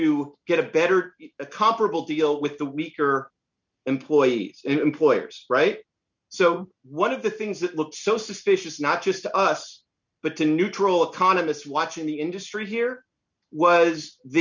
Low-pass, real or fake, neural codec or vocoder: 7.2 kHz; real; none